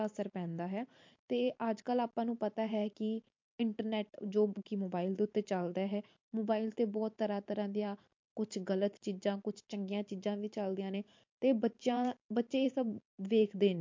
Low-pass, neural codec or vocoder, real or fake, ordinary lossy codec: 7.2 kHz; vocoder, 44.1 kHz, 128 mel bands every 512 samples, BigVGAN v2; fake; MP3, 48 kbps